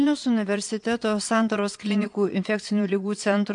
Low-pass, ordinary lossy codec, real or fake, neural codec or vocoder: 9.9 kHz; MP3, 64 kbps; fake; vocoder, 22.05 kHz, 80 mel bands, Vocos